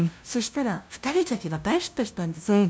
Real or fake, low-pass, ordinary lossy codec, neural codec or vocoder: fake; none; none; codec, 16 kHz, 0.5 kbps, FunCodec, trained on LibriTTS, 25 frames a second